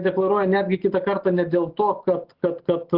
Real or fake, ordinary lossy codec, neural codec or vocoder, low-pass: real; Opus, 16 kbps; none; 5.4 kHz